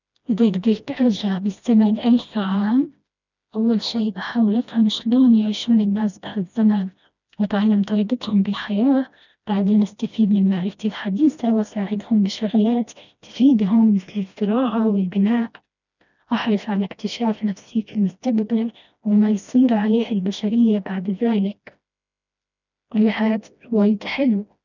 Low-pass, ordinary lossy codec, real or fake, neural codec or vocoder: 7.2 kHz; none; fake; codec, 16 kHz, 1 kbps, FreqCodec, smaller model